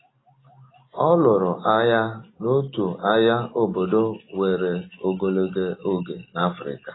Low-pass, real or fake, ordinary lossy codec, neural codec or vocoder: 7.2 kHz; real; AAC, 16 kbps; none